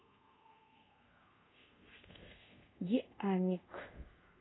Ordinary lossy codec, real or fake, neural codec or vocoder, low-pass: AAC, 16 kbps; fake; codec, 24 kHz, 0.5 kbps, DualCodec; 7.2 kHz